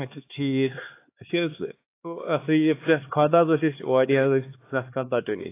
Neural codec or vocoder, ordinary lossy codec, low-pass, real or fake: codec, 16 kHz, 2 kbps, X-Codec, HuBERT features, trained on LibriSpeech; AAC, 24 kbps; 3.6 kHz; fake